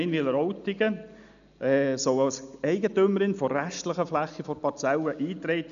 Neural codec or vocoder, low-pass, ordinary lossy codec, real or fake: none; 7.2 kHz; none; real